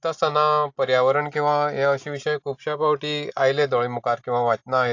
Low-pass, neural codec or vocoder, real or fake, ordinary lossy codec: 7.2 kHz; none; real; AAC, 48 kbps